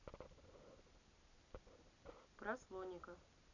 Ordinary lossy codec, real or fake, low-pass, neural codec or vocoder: none; real; 7.2 kHz; none